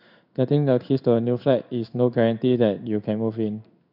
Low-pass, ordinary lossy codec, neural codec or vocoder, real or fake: 5.4 kHz; none; codec, 16 kHz in and 24 kHz out, 1 kbps, XY-Tokenizer; fake